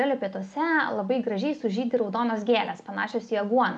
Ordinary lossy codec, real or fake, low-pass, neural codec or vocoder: MP3, 96 kbps; real; 10.8 kHz; none